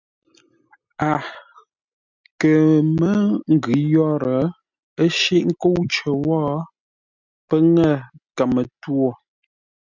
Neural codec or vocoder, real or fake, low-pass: none; real; 7.2 kHz